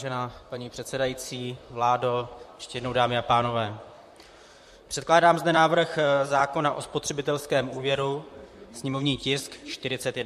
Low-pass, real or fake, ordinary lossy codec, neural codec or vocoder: 14.4 kHz; fake; MP3, 64 kbps; vocoder, 44.1 kHz, 128 mel bands, Pupu-Vocoder